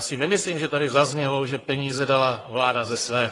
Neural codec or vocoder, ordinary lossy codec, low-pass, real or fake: codec, 44.1 kHz, 1.7 kbps, Pupu-Codec; AAC, 32 kbps; 10.8 kHz; fake